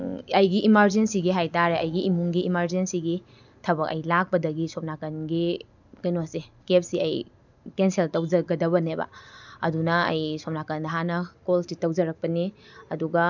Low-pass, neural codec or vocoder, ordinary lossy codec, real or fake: 7.2 kHz; none; none; real